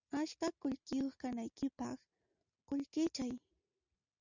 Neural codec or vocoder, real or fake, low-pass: none; real; 7.2 kHz